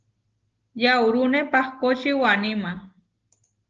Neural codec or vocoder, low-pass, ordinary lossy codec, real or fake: none; 7.2 kHz; Opus, 16 kbps; real